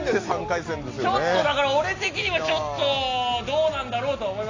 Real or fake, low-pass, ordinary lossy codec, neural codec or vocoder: real; 7.2 kHz; AAC, 32 kbps; none